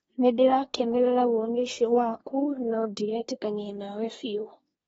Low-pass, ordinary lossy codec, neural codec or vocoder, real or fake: 10.8 kHz; AAC, 24 kbps; codec, 24 kHz, 1 kbps, SNAC; fake